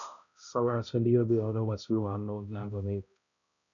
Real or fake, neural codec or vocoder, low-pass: fake; codec, 16 kHz, 0.5 kbps, X-Codec, HuBERT features, trained on balanced general audio; 7.2 kHz